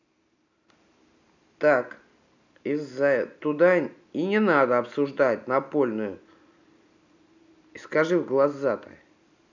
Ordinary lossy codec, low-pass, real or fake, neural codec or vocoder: none; 7.2 kHz; real; none